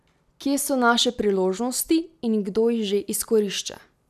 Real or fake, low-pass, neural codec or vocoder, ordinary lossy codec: real; 14.4 kHz; none; none